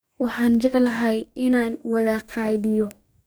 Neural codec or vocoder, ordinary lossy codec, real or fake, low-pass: codec, 44.1 kHz, 2.6 kbps, DAC; none; fake; none